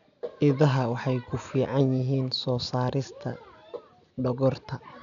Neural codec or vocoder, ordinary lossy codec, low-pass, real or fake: none; none; 7.2 kHz; real